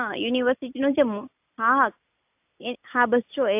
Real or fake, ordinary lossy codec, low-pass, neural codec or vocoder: real; AAC, 32 kbps; 3.6 kHz; none